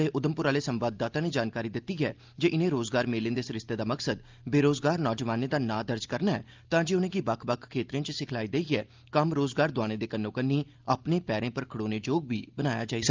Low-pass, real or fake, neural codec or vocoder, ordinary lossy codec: 7.2 kHz; real; none; Opus, 32 kbps